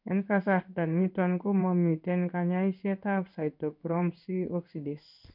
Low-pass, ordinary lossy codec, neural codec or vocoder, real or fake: 5.4 kHz; none; codec, 16 kHz in and 24 kHz out, 1 kbps, XY-Tokenizer; fake